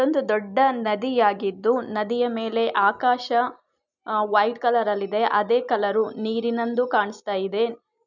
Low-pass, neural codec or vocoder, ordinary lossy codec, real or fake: 7.2 kHz; none; none; real